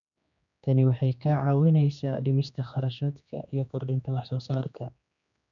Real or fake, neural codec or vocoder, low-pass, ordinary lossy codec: fake; codec, 16 kHz, 2 kbps, X-Codec, HuBERT features, trained on general audio; 7.2 kHz; none